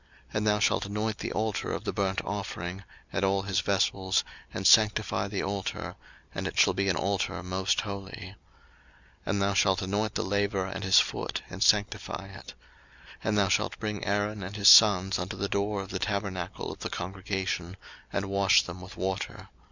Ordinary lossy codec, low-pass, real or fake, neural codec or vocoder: Opus, 64 kbps; 7.2 kHz; real; none